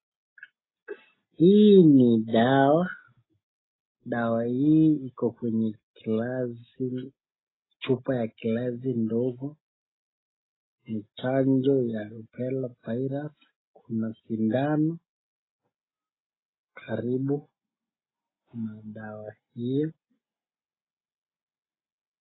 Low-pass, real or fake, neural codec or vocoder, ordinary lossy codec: 7.2 kHz; real; none; AAC, 16 kbps